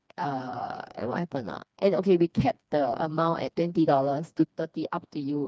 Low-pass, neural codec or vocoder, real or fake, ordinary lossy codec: none; codec, 16 kHz, 2 kbps, FreqCodec, smaller model; fake; none